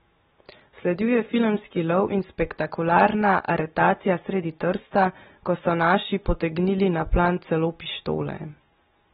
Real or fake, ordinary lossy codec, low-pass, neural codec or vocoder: real; AAC, 16 kbps; 19.8 kHz; none